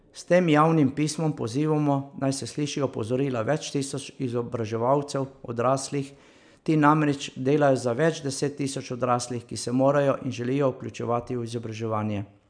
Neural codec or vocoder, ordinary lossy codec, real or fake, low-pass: none; none; real; 9.9 kHz